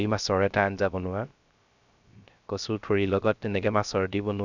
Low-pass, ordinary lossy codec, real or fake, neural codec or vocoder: 7.2 kHz; none; fake; codec, 16 kHz, 0.3 kbps, FocalCodec